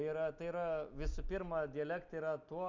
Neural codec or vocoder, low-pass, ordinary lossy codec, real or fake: none; 7.2 kHz; MP3, 64 kbps; real